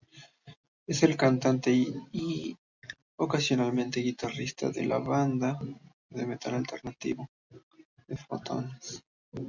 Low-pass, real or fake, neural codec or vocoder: 7.2 kHz; real; none